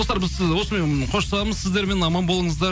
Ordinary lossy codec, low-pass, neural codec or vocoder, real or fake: none; none; none; real